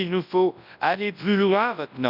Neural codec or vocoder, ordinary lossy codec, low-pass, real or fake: codec, 24 kHz, 0.9 kbps, WavTokenizer, large speech release; none; 5.4 kHz; fake